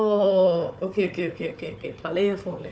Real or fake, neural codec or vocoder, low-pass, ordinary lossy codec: fake; codec, 16 kHz, 4 kbps, FunCodec, trained on Chinese and English, 50 frames a second; none; none